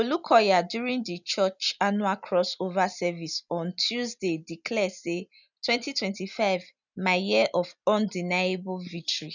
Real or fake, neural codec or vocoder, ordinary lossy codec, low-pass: real; none; none; 7.2 kHz